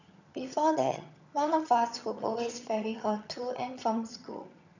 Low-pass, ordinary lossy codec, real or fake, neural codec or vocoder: 7.2 kHz; none; fake; vocoder, 22.05 kHz, 80 mel bands, HiFi-GAN